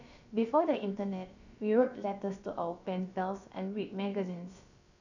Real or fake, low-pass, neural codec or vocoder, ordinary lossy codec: fake; 7.2 kHz; codec, 16 kHz, about 1 kbps, DyCAST, with the encoder's durations; none